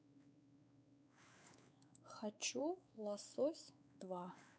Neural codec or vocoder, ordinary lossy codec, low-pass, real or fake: codec, 16 kHz, 4 kbps, X-Codec, WavLM features, trained on Multilingual LibriSpeech; none; none; fake